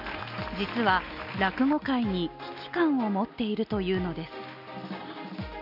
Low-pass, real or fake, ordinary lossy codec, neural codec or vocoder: 5.4 kHz; real; none; none